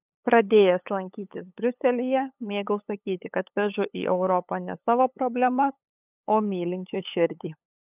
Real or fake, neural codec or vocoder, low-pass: fake; codec, 16 kHz, 8 kbps, FunCodec, trained on LibriTTS, 25 frames a second; 3.6 kHz